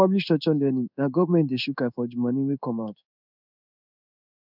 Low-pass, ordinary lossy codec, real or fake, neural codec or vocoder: 5.4 kHz; none; fake; codec, 16 kHz in and 24 kHz out, 1 kbps, XY-Tokenizer